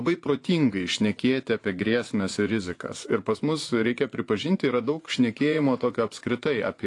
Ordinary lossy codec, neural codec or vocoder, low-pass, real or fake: AAC, 48 kbps; vocoder, 24 kHz, 100 mel bands, Vocos; 10.8 kHz; fake